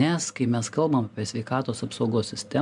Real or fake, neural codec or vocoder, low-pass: real; none; 10.8 kHz